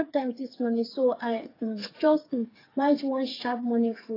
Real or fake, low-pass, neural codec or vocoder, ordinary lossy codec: fake; 5.4 kHz; codec, 16 kHz, 4 kbps, FreqCodec, smaller model; AAC, 24 kbps